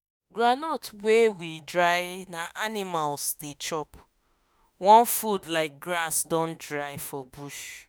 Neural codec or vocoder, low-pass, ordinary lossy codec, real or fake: autoencoder, 48 kHz, 32 numbers a frame, DAC-VAE, trained on Japanese speech; none; none; fake